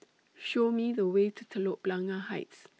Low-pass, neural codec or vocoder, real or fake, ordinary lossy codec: none; none; real; none